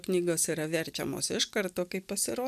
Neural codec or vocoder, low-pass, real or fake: none; 14.4 kHz; real